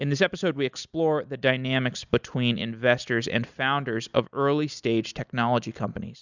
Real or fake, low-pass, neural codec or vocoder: real; 7.2 kHz; none